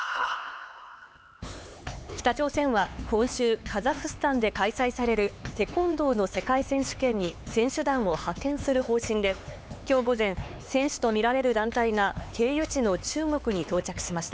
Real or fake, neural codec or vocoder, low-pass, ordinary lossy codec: fake; codec, 16 kHz, 4 kbps, X-Codec, HuBERT features, trained on LibriSpeech; none; none